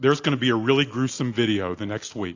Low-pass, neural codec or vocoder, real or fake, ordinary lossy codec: 7.2 kHz; none; real; AAC, 48 kbps